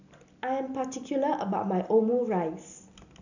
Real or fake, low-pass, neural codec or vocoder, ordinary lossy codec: real; 7.2 kHz; none; none